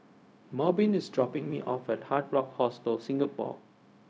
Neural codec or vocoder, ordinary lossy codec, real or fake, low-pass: codec, 16 kHz, 0.4 kbps, LongCat-Audio-Codec; none; fake; none